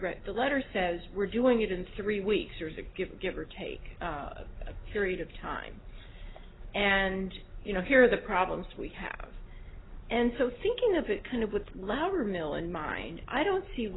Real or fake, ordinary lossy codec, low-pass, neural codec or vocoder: real; AAC, 16 kbps; 7.2 kHz; none